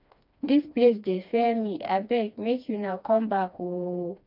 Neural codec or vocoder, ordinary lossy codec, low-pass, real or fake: codec, 16 kHz, 2 kbps, FreqCodec, smaller model; none; 5.4 kHz; fake